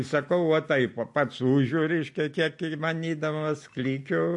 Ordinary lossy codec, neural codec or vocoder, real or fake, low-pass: MP3, 48 kbps; none; real; 10.8 kHz